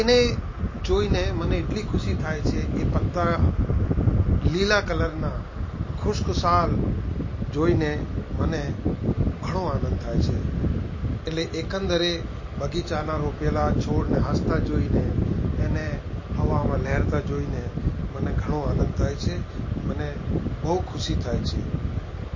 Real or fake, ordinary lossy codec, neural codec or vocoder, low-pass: real; MP3, 32 kbps; none; 7.2 kHz